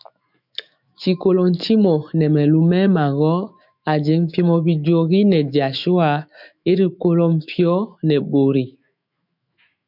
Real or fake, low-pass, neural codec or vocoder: fake; 5.4 kHz; autoencoder, 48 kHz, 128 numbers a frame, DAC-VAE, trained on Japanese speech